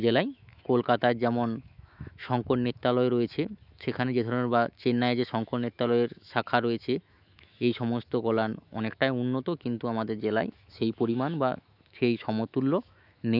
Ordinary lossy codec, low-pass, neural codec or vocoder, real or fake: none; 5.4 kHz; autoencoder, 48 kHz, 128 numbers a frame, DAC-VAE, trained on Japanese speech; fake